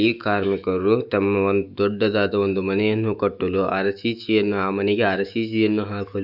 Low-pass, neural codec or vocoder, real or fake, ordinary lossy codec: 5.4 kHz; autoencoder, 48 kHz, 128 numbers a frame, DAC-VAE, trained on Japanese speech; fake; none